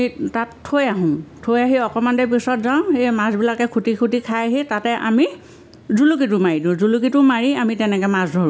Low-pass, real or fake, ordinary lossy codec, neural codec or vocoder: none; real; none; none